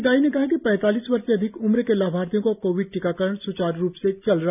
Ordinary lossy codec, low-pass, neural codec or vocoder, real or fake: none; 3.6 kHz; none; real